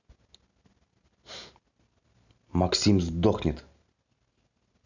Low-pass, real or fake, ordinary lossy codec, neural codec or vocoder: 7.2 kHz; real; none; none